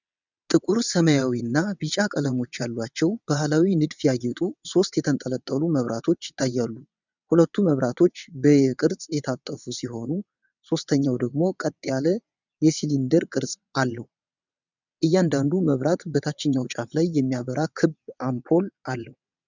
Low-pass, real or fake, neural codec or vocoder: 7.2 kHz; fake; vocoder, 22.05 kHz, 80 mel bands, WaveNeXt